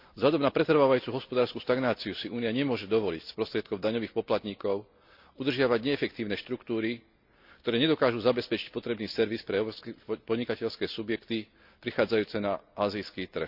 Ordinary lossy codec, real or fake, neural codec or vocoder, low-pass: none; real; none; 5.4 kHz